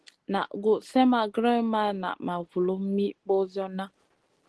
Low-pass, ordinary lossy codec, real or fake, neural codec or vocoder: 10.8 kHz; Opus, 16 kbps; real; none